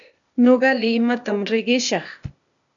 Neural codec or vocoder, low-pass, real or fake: codec, 16 kHz, 0.8 kbps, ZipCodec; 7.2 kHz; fake